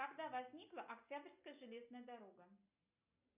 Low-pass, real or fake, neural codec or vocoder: 3.6 kHz; real; none